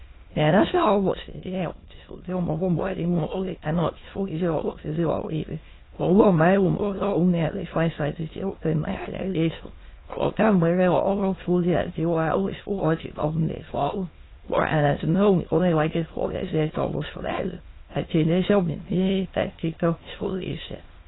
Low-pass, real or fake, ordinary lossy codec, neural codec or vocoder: 7.2 kHz; fake; AAC, 16 kbps; autoencoder, 22.05 kHz, a latent of 192 numbers a frame, VITS, trained on many speakers